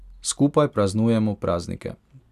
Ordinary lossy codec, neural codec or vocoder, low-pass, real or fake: none; none; 14.4 kHz; real